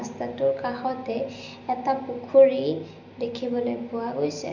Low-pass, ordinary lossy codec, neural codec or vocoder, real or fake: 7.2 kHz; none; none; real